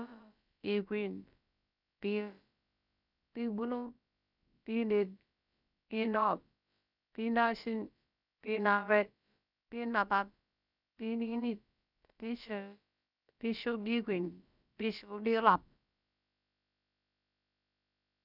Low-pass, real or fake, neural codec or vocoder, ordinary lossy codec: 5.4 kHz; fake; codec, 16 kHz, about 1 kbps, DyCAST, with the encoder's durations; none